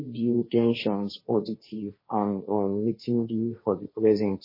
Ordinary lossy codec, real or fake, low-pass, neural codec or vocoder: MP3, 24 kbps; fake; 5.4 kHz; codec, 16 kHz, 1.1 kbps, Voila-Tokenizer